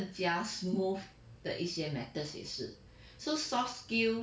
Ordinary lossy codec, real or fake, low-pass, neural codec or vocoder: none; real; none; none